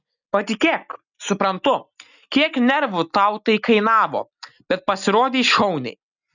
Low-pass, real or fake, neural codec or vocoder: 7.2 kHz; real; none